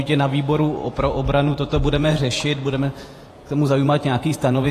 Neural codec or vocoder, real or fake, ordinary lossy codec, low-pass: vocoder, 48 kHz, 128 mel bands, Vocos; fake; AAC, 48 kbps; 14.4 kHz